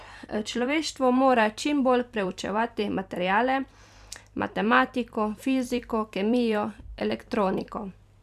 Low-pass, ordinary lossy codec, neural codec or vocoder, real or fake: 14.4 kHz; none; vocoder, 44.1 kHz, 128 mel bands every 256 samples, BigVGAN v2; fake